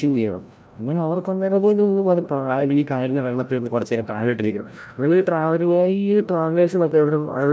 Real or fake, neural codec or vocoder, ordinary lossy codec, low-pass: fake; codec, 16 kHz, 0.5 kbps, FreqCodec, larger model; none; none